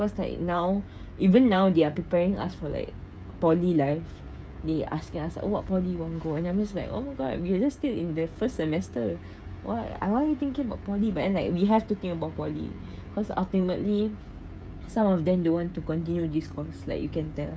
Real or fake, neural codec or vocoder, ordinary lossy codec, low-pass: fake; codec, 16 kHz, 16 kbps, FreqCodec, smaller model; none; none